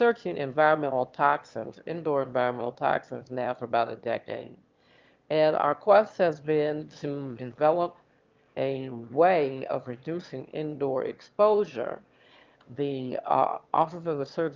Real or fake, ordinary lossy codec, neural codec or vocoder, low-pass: fake; Opus, 32 kbps; autoencoder, 22.05 kHz, a latent of 192 numbers a frame, VITS, trained on one speaker; 7.2 kHz